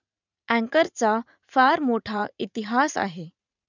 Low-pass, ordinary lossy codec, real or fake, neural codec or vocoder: 7.2 kHz; none; fake; vocoder, 44.1 kHz, 80 mel bands, Vocos